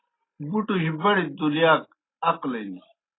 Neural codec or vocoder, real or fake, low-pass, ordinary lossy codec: none; real; 7.2 kHz; AAC, 16 kbps